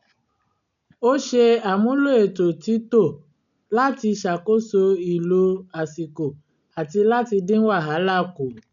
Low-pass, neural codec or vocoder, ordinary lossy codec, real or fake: 7.2 kHz; none; none; real